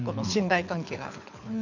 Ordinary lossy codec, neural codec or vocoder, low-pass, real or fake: none; codec, 24 kHz, 6 kbps, HILCodec; 7.2 kHz; fake